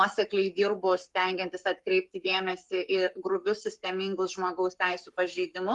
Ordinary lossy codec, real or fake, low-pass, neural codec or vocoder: Opus, 32 kbps; fake; 10.8 kHz; codec, 44.1 kHz, 7.8 kbps, Pupu-Codec